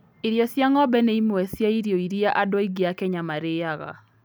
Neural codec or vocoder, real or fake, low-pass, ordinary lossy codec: none; real; none; none